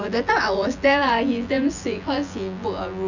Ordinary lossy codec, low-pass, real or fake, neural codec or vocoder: none; 7.2 kHz; fake; vocoder, 24 kHz, 100 mel bands, Vocos